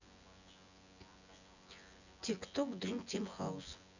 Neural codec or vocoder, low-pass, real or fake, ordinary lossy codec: vocoder, 24 kHz, 100 mel bands, Vocos; 7.2 kHz; fake; none